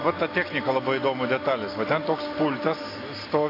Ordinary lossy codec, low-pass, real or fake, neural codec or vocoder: AAC, 24 kbps; 5.4 kHz; real; none